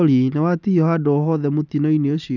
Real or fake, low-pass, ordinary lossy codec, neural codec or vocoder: real; 7.2 kHz; none; none